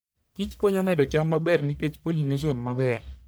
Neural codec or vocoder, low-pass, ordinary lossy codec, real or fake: codec, 44.1 kHz, 1.7 kbps, Pupu-Codec; none; none; fake